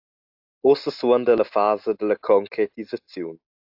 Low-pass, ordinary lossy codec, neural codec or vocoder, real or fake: 5.4 kHz; AAC, 48 kbps; none; real